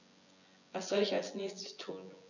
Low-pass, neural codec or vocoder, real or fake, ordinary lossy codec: 7.2 kHz; vocoder, 24 kHz, 100 mel bands, Vocos; fake; none